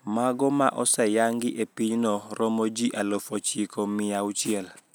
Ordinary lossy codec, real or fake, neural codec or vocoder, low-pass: none; real; none; none